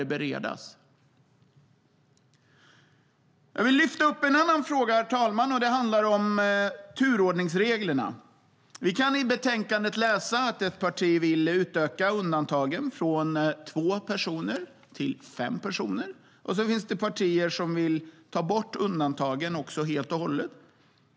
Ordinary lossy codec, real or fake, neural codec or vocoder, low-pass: none; real; none; none